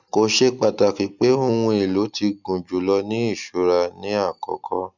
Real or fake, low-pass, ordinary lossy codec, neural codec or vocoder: real; 7.2 kHz; none; none